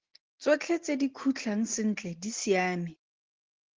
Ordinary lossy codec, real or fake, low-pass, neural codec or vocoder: Opus, 16 kbps; real; 7.2 kHz; none